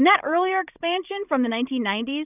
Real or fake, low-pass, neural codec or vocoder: fake; 3.6 kHz; codec, 16 kHz, 16 kbps, FreqCodec, larger model